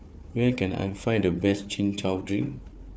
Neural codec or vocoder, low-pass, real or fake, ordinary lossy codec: codec, 16 kHz, 4 kbps, FunCodec, trained on Chinese and English, 50 frames a second; none; fake; none